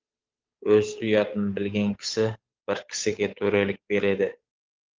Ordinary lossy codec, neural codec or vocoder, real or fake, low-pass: Opus, 24 kbps; codec, 16 kHz, 8 kbps, FunCodec, trained on Chinese and English, 25 frames a second; fake; 7.2 kHz